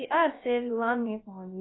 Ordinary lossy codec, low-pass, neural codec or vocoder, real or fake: AAC, 16 kbps; 7.2 kHz; codec, 16 kHz, about 1 kbps, DyCAST, with the encoder's durations; fake